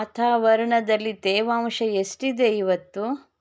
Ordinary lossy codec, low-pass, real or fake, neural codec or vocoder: none; none; real; none